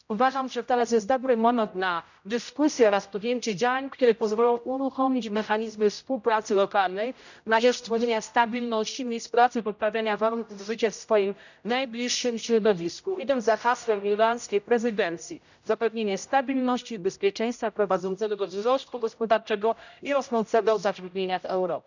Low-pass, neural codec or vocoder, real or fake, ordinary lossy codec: 7.2 kHz; codec, 16 kHz, 0.5 kbps, X-Codec, HuBERT features, trained on general audio; fake; none